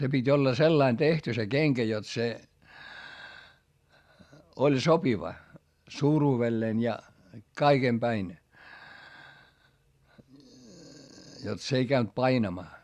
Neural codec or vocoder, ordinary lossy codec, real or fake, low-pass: none; Opus, 64 kbps; real; 14.4 kHz